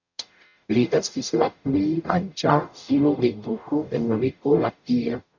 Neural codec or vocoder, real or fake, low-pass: codec, 44.1 kHz, 0.9 kbps, DAC; fake; 7.2 kHz